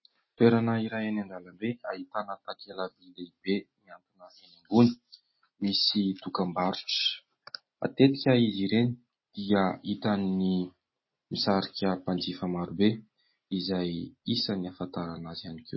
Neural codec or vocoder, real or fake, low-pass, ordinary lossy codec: none; real; 7.2 kHz; MP3, 24 kbps